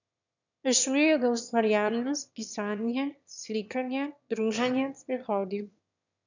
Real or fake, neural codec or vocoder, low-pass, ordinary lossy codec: fake; autoencoder, 22.05 kHz, a latent of 192 numbers a frame, VITS, trained on one speaker; 7.2 kHz; none